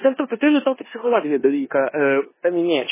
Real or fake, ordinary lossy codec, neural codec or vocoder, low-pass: fake; MP3, 16 kbps; codec, 16 kHz in and 24 kHz out, 0.9 kbps, LongCat-Audio-Codec, four codebook decoder; 3.6 kHz